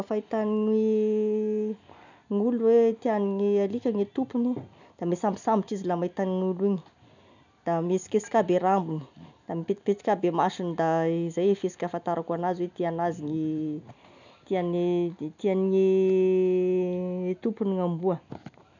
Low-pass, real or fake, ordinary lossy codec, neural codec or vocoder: 7.2 kHz; real; none; none